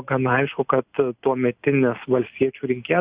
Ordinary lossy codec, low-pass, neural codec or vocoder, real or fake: Opus, 64 kbps; 3.6 kHz; none; real